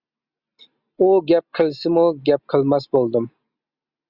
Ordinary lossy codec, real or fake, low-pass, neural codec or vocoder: Opus, 64 kbps; real; 5.4 kHz; none